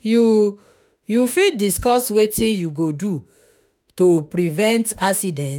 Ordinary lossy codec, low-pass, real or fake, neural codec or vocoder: none; none; fake; autoencoder, 48 kHz, 32 numbers a frame, DAC-VAE, trained on Japanese speech